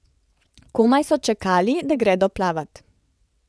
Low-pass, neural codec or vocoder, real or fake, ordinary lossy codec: none; vocoder, 22.05 kHz, 80 mel bands, WaveNeXt; fake; none